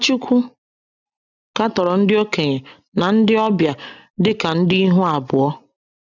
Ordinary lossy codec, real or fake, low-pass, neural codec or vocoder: none; real; 7.2 kHz; none